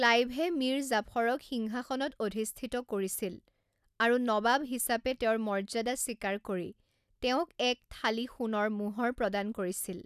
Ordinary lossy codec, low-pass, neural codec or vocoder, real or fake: none; 14.4 kHz; none; real